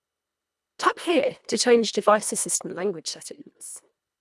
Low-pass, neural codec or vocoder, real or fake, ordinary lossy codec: none; codec, 24 kHz, 1.5 kbps, HILCodec; fake; none